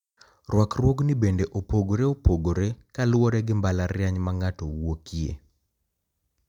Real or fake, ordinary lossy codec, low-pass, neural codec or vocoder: real; none; 19.8 kHz; none